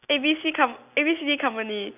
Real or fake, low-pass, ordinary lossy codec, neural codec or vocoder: real; 3.6 kHz; none; none